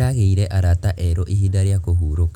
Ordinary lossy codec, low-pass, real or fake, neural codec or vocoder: none; 19.8 kHz; real; none